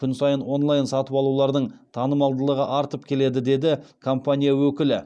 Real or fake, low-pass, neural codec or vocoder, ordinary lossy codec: real; none; none; none